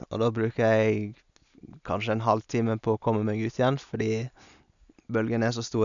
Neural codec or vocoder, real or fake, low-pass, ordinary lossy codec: none; real; 7.2 kHz; none